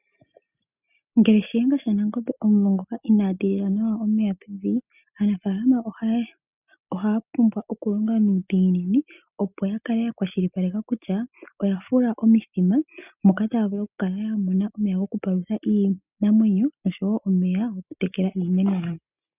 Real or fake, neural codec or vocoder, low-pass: real; none; 3.6 kHz